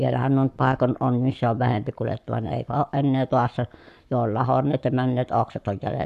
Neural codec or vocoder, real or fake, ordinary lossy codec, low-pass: codec, 44.1 kHz, 7.8 kbps, Pupu-Codec; fake; none; 14.4 kHz